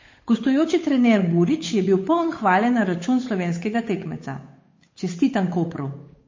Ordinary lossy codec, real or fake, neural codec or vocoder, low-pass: MP3, 32 kbps; fake; codec, 16 kHz, 8 kbps, FunCodec, trained on Chinese and English, 25 frames a second; 7.2 kHz